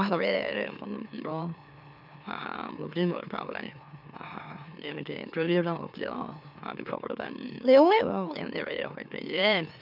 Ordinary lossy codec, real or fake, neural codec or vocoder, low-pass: none; fake; autoencoder, 44.1 kHz, a latent of 192 numbers a frame, MeloTTS; 5.4 kHz